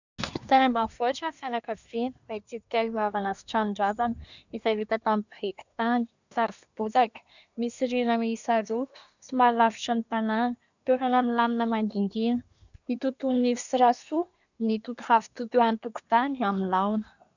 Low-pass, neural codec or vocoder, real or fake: 7.2 kHz; codec, 24 kHz, 1 kbps, SNAC; fake